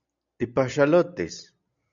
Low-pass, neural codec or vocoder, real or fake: 7.2 kHz; none; real